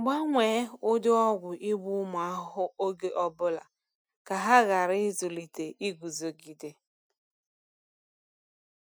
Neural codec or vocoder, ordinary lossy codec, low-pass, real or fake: none; none; none; real